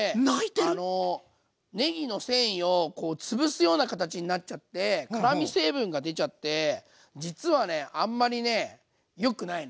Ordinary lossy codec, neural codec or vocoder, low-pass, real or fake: none; none; none; real